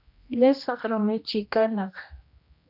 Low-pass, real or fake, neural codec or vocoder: 5.4 kHz; fake; codec, 16 kHz, 1 kbps, X-Codec, HuBERT features, trained on general audio